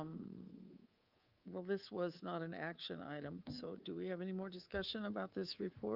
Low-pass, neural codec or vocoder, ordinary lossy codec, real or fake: 5.4 kHz; codec, 24 kHz, 3.1 kbps, DualCodec; Opus, 24 kbps; fake